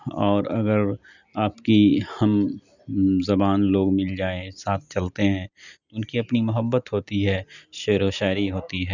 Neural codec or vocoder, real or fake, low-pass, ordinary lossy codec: none; real; 7.2 kHz; none